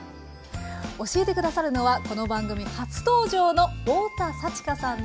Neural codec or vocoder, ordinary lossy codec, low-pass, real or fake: none; none; none; real